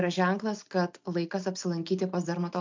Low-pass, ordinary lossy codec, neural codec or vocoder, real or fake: 7.2 kHz; MP3, 64 kbps; none; real